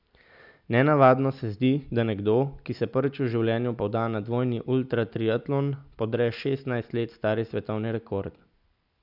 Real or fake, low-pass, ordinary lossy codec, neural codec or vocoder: real; 5.4 kHz; none; none